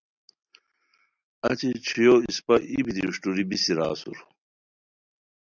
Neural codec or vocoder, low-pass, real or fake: none; 7.2 kHz; real